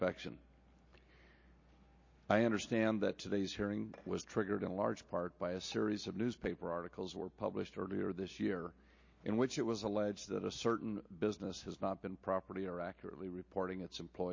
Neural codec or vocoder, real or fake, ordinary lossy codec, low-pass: none; real; MP3, 32 kbps; 7.2 kHz